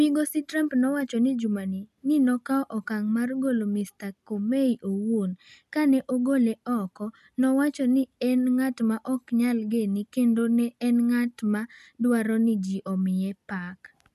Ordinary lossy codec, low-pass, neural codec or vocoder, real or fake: none; 14.4 kHz; none; real